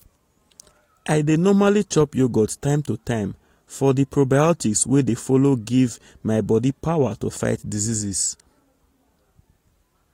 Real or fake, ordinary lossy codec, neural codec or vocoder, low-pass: real; AAC, 48 kbps; none; 19.8 kHz